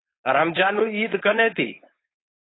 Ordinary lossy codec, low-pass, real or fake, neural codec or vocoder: AAC, 16 kbps; 7.2 kHz; fake; codec, 16 kHz, 4.8 kbps, FACodec